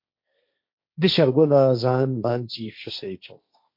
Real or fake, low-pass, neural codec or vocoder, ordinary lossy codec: fake; 5.4 kHz; codec, 16 kHz, 1.1 kbps, Voila-Tokenizer; MP3, 32 kbps